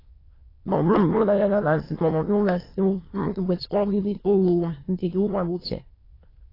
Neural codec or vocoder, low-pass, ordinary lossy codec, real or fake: autoencoder, 22.05 kHz, a latent of 192 numbers a frame, VITS, trained on many speakers; 5.4 kHz; AAC, 24 kbps; fake